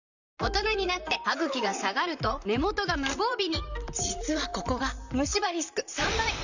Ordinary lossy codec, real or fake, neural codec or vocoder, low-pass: none; fake; vocoder, 44.1 kHz, 128 mel bands, Pupu-Vocoder; 7.2 kHz